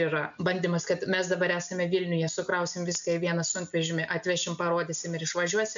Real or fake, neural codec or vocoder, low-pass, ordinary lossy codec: real; none; 7.2 kHz; MP3, 64 kbps